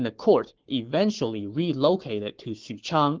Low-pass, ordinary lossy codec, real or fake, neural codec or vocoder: 7.2 kHz; Opus, 16 kbps; fake; codec, 44.1 kHz, 7.8 kbps, Pupu-Codec